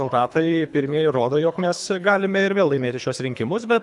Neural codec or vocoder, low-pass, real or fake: codec, 24 kHz, 3 kbps, HILCodec; 10.8 kHz; fake